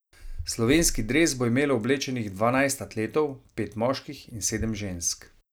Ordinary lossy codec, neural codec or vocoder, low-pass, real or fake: none; none; none; real